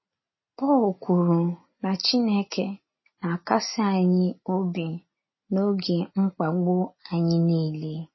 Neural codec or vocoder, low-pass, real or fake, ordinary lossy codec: none; 7.2 kHz; real; MP3, 24 kbps